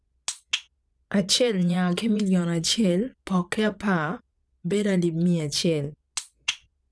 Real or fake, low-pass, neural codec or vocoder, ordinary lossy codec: fake; none; vocoder, 22.05 kHz, 80 mel bands, Vocos; none